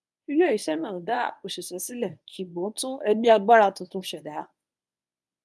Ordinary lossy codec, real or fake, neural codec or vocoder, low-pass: none; fake; codec, 24 kHz, 0.9 kbps, WavTokenizer, medium speech release version 1; none